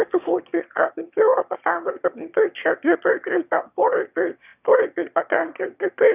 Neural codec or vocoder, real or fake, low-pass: autoencoder, 22.05 kHz, a latent of 192 numbers a frame, VITS, trained on one speaker; fake; 3.6 kHz